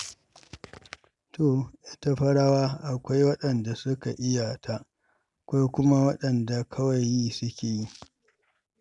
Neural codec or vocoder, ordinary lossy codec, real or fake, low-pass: none; none; real; 10.8 kHz